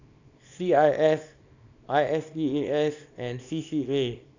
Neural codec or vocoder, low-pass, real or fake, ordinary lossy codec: codec, 24 kHz, 0.9 kbps, WavTokenizer, small release; 7.2 kHz; fake; none